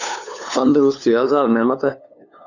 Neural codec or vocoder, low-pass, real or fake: codec, 16 kHz, 2 kbps, FunCodec, trained on LibriTTS, 25 frames a second; 7.2 kHz; fake